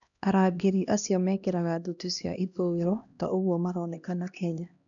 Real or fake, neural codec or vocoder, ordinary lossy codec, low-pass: fake; codec, 16 kHz, 1 kbps, X-Codec, HuBERT features, trained on LibriSpeech; none; 7.2 kHz